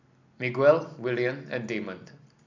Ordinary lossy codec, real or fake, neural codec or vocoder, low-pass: none; real; none; 7.2 kHz